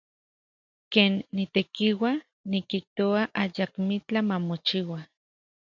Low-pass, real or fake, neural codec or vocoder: 7.2 kHz; real; none